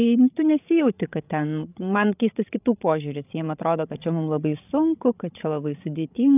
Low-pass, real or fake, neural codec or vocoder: 3.6 kHz; fake; codec, 16 kHz, 16 kbps, FreqCodec, larger model